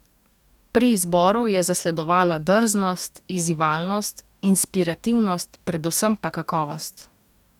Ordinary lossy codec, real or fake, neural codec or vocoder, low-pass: none; fake; codec, 44.1 kHz, 2.6 kbps, DAC; 19.8 kHz